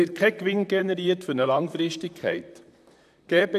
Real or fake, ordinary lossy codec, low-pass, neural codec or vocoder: fake; none; 14.4 kHz; vocoder, 44.1 kHz, 128 mel bands, Pupu-Vocoder